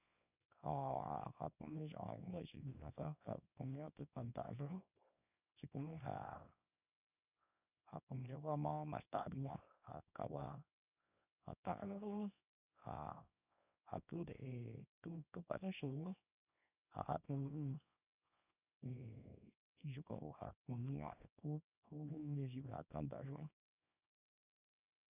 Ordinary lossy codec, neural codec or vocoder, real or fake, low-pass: none; codec, 24 kHz, 0.9 kbps, WavTokenizer, small release; fake; 3.6 kHz